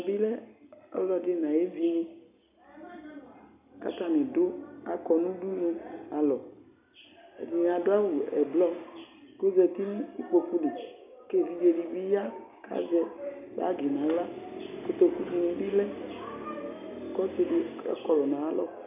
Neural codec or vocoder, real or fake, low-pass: none; real; 3.6 kHz